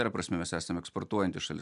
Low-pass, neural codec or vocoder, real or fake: 10.8 kHz; none; real